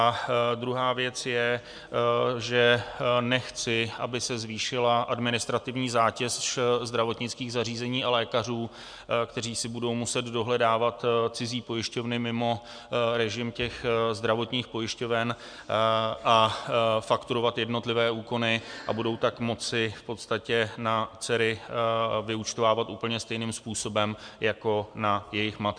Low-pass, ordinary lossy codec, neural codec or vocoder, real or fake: 9.9 kHz; MP3, 96 kbps; none; real